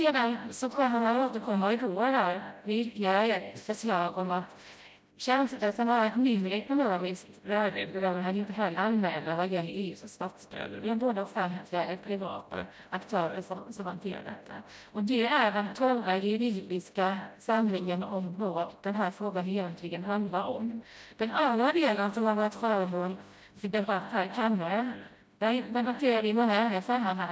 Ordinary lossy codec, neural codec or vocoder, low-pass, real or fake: none; codec, 16 kHz, 0.5 kbps, FreqCodec, smaller model; none; fake